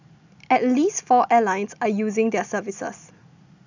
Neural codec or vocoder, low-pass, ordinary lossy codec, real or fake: none; 7.2 kHz; none; real